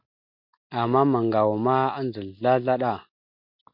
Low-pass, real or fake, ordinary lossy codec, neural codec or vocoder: 5.4 kHz; real; MP3, 48 kbps; none